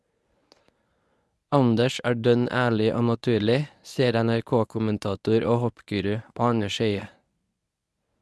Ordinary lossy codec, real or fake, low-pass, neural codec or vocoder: none; fake; none; codec, 24 kHz, 0.9 kbps, WavTokenizer, medium speech release version 1